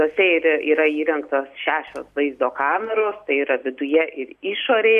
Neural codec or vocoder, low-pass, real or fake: none; 14.4 kHz; real